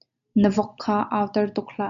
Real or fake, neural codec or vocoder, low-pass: real; none; 7.2 kHz